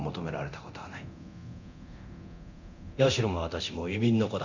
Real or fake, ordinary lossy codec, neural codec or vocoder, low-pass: fake; none; codec, 24 kHz, 0.9 kbps, DualCodec; 7.2 kHz